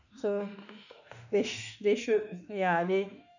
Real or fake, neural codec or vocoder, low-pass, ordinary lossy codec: fake; autoencoder, 48 kHz, 32 numbers a frame, DAC-VAE, trained on Japanese speech; 7.2 kHz; none